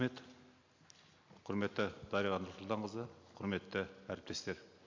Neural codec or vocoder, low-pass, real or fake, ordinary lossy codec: none; 7.2 kHz; real; MP3, 64 kbps